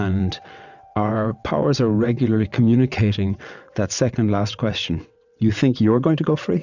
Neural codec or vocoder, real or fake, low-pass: vocoder, 22.05 kHz, 80 mel bands, WaveNeXt; fake; 7.2 kHz